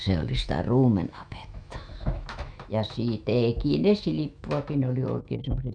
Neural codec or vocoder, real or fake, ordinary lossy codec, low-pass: autoencoder, 48 kHz, 128 numbers a frame, DAC-VAE, trained on Japanese speech; fake; none; 9.9 kHz